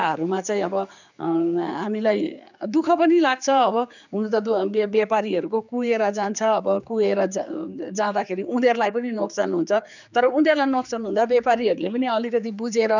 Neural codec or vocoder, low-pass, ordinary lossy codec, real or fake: codec, 16 kHz, 4 kbps, X-Codec, HuBERT features, trained on general audio; 7.2 kHz; none; fake